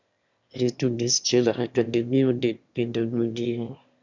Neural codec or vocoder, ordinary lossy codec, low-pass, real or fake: autoencoder, 22.05 kHz, a latent of 192 numbers a frame, VITS, trained on one speaker; Opus, 64 kbps; 7.2 kHz; fake